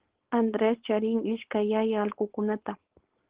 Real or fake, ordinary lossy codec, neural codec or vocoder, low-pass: fake; Opus, 16 kbps; codec, 16 kHz, 4.8 kbps, FACodec; 3.6 kHz